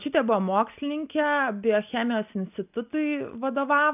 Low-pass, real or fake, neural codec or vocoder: 3.6 kHz; real; none